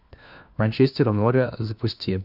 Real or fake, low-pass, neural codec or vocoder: fake; 5.4 kHz; codec, 16 kHz, 1 kbps, FunCodec, trained on LibriTTS, 50 frames a second